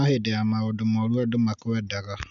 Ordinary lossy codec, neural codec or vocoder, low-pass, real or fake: none; none; 7.2 kHz; real